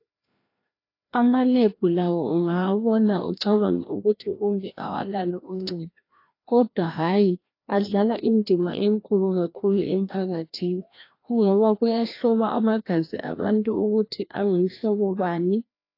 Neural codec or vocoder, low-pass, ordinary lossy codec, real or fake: codec, 16 kHz, 1 kbps, FreqCodec, larger model; 5.4 kHz; AAC, 32 kbps; fake